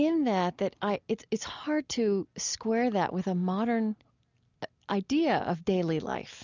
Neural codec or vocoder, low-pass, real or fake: none; 7.2 kHz; real